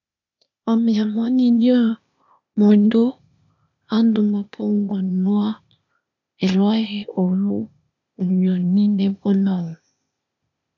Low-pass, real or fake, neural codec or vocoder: 7.2 kHz; fake; codec, 16 kHz, 0.8 kbps, ZipCodec